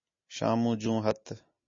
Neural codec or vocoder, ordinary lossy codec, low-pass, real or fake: none; MP3, 32 kbps; 7.2 kHz; real